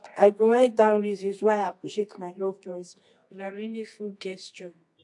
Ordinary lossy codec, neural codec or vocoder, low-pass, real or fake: AAC, 64 kbps; codec, 24 kHz, 0.9 kbps, WavTokenizer, medium music audio release; 10.8 kHz; fake